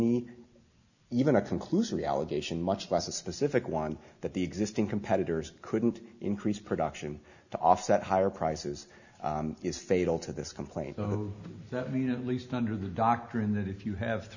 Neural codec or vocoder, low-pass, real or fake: none; 7.2 kHz; real